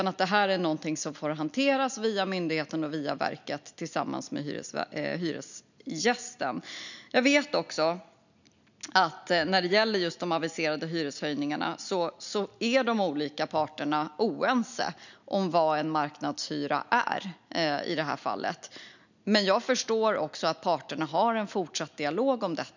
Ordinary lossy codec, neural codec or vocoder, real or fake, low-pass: none; none; real; 7.2 kHz